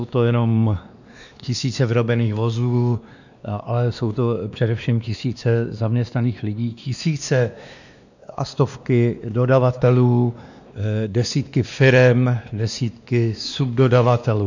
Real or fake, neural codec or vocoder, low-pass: fake; codec, 16 kHz, 2 kbps, X-Codec, WavLM features, trained on Multilingual LibriSpeech; 7.2 kHz